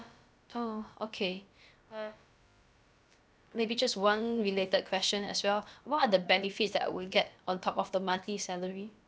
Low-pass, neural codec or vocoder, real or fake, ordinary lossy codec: none; codec, 16 kHz, about 1 kbps, DyCAST, with the encoder's durations; fake; none